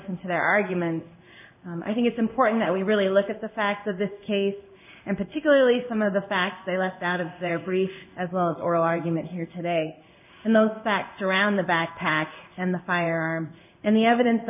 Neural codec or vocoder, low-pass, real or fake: codec, 16 kHz in and 24 kHz out, 1 kbps, XY-Tokenizer; 3.6 kHz; fake